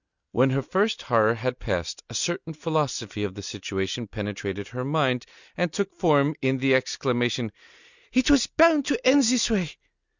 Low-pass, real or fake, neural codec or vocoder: 7.2 kHz; real; none